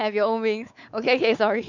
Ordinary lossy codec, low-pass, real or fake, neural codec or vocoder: none; 7.2 kHz; fake; codec, 16 kHz, 4 kbps, X-Codec, WavLM features, trained on Multilingual LibriSpeech